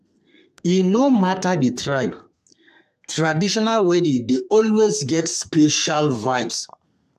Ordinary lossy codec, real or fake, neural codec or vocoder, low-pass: AAC, 96 kbps; fake; codec, 32 kHz, 1.9 kbps, SNAC; 14.4 kHz